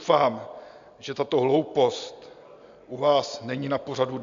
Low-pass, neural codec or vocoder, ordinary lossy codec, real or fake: 7.2 kHz; none; AAC, 64 kbps; real